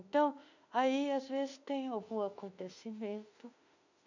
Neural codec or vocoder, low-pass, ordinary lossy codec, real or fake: autoencoder, 48 kHz, 32 numbers a frame, DAC-VAE, trained on Japanese speech; 7.2 kHz; none; fake